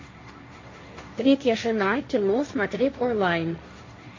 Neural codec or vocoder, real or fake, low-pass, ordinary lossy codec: codec, 16 kHz, 1.1 kbps, Voila-Tokenizer; fake; 7.2 kHz; MP3, 32 kbps